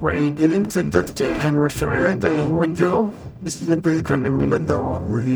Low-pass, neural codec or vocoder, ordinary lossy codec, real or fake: none; codec, 44.1 kHz, 0.9 kbps, DAC; none; fake